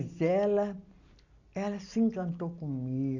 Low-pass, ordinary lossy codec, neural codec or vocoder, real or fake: 7.2 kHz; none; none; real